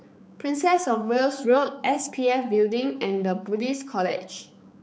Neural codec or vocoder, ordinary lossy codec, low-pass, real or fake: codec, 16 kHz, 4 kbps, X-Codec, HuBERT features, trained on balanced general audio; none; none; fake